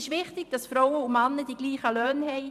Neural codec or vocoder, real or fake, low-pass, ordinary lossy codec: vocoder, 44.1 kHz, 128 mel bands every 512 samples, BigVGAN v2; fake; 14.4 kHz; none